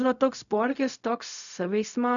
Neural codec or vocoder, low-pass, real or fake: codec, 16 kHz, 0.4 kbps, LongCat-Audio-Codec; 7.2 kHz; fake